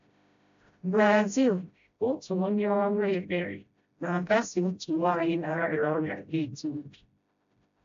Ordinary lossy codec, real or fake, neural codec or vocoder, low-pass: AAC, 64 kbps; fake; codec, 16 kHz, 0.5 kbps, FreqCodec, smaller model; 7.2 kHz